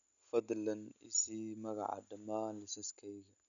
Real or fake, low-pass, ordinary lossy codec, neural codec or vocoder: real; 7.2 kHz; none; none